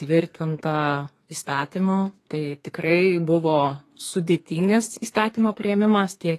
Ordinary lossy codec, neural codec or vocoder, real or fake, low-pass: AAC, 48 kbps; codec, 32 kHz, 1.9 kbps, SNAC; fake; 14.4 kHz